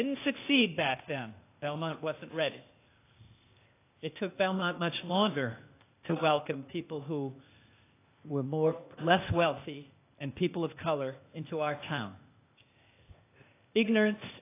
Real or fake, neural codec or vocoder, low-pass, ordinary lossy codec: fake; codec, 16 kHz, 0.8 kbps, ZipCodec; 3.6 kHz; AAC, 24 kbps